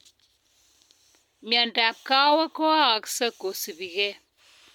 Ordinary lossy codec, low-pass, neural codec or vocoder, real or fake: MP3, 96 kbps; 19.8 kHz; none; real